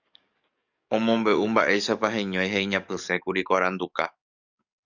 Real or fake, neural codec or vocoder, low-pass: fake; codec, 44.1 kHz, 7.8 kbps, DAC; 7.2 kHz